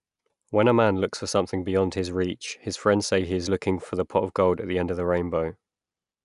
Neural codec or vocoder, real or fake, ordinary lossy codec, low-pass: none; real; none; 10.8 kHz